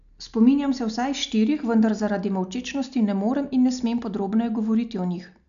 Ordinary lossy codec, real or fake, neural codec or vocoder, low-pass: none; real; none; 7.2 kHz